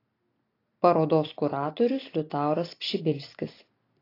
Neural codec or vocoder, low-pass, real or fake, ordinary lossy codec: none; 5.4 kHz; real; AAC, 32 kbps